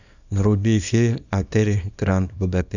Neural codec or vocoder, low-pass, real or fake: codec, 24 kHz, 0.9 kbps, WavTokenizer, small release; 7.2 kHz; fake